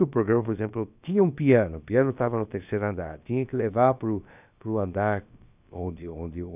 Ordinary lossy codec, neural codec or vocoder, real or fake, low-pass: none; codec, 16 kHz, about 1 kbps, DyCAST, with the encoder's durations; fake; 3.6 kHz